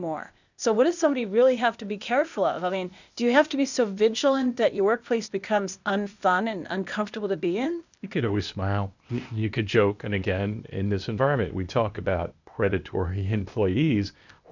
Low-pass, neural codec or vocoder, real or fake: 7.2 kHz; codec, 16 kHz, 0.8 kbps, ZipCodec; fake